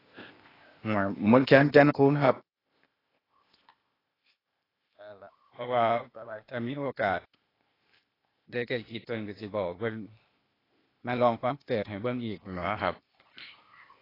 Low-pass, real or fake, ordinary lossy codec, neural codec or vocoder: 5.4 kHz; fake; AAC, 24 kbps; codec, 16 kHz, 0.8 kbps, ZipCodec